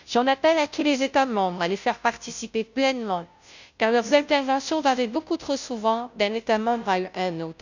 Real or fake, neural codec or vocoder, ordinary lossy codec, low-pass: fake; codec, 16 kHz, 0.5 kbps, FunCodec, trained on Chinese and English, 25 frames a second; none; 7.2 kHz